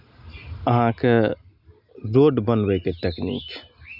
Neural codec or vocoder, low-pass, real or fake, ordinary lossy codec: none; 5.4 kHz; real; none